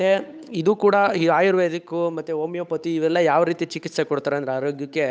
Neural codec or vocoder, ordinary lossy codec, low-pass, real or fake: codec, 16 kHz, 8 kbps, FunCodec, trained on Chinese and English, 25 frames a second; none; none; fake